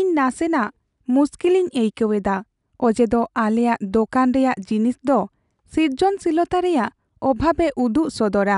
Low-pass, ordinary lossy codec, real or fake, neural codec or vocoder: 10.8 kHz; none; real; none